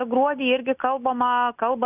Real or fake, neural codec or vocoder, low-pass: real; none; 3.6 kHz